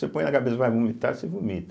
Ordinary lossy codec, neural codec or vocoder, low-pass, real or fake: none; none; none; real